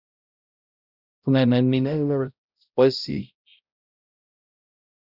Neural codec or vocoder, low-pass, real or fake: codec, 16 kHz, 0.5 kbps, X-Codec, HuBERT features, trained on balanced general audio; 5.4 kHz; fake